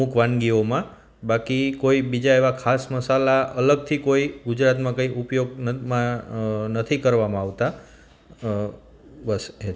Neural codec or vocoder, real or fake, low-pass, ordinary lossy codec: none; real; none; none